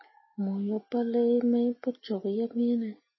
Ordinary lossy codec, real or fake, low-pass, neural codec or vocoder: MP3, 24 kbps; real; 7.2 kHz; none